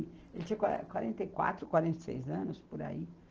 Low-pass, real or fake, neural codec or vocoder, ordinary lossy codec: 7.2 kHz; real; none; Opus, 16 kbps